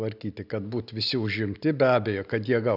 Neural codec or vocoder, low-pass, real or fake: none; 5.4 kHz; real